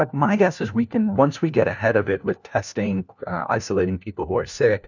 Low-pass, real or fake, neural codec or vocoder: 7.2 kHz; fake; codec, 16 kHz, 1 kbps, FunCodec, trained on LibriTTS, 50 frames a second